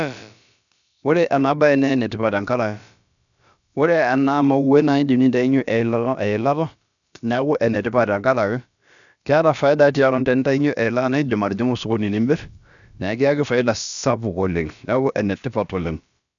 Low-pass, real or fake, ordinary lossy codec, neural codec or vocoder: 7.2 kHz; fake; none; codec, 16 kHz, about 1 kbps, DyCAST, with the encoder's durations